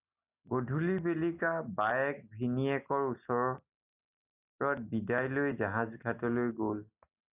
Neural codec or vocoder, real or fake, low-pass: none; real; 3.6 kHz